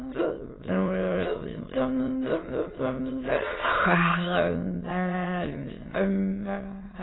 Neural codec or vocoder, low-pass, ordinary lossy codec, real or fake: autoencoder, 22.05 kHz, a latent of 192 numbers a frame, VITS, trained on many speakers; 7.2 kHz; AAC, 16 kbps; fake